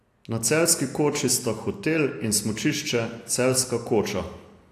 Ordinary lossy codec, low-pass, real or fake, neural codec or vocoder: AAC, 64 kbps; 14.4 kHz; real; none